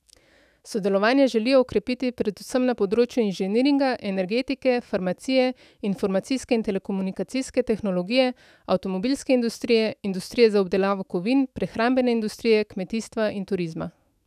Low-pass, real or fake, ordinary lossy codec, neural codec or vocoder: 14.4 kHz; fake; none; autoencoder, 48 kHz, 128 numbers a frame, DAC-VAE, trained on Japanese speech